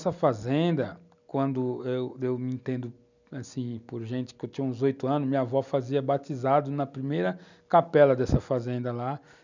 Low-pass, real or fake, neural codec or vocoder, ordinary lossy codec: 7.2 kHz; real; none; none